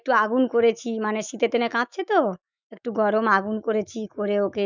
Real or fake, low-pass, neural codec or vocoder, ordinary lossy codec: fake; 7.2 kHz; autoencoder, 48 kHz, 128 numbers a frame, DAC-VAE, trained on Japanese speech; none